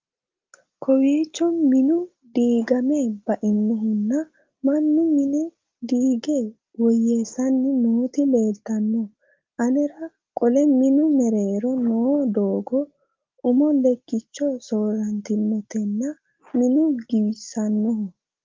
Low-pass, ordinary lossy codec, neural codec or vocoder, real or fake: 7.2 kHz; Opus, 24 kbps; none; real